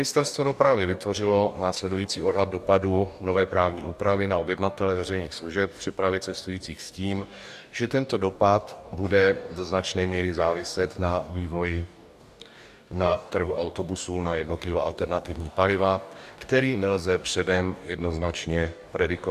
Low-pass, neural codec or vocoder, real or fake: 14.4 kHz; codec, 44.1 kHz, 2.6 kbps, DAC; fake